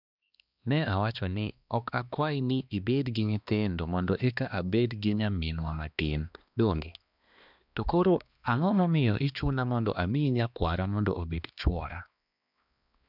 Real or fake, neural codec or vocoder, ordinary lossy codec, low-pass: fake; codec, 16 kHz, 2 kbps, X-Codec, HuBERT features, trained on balanced general audio; none; 5.4 kHz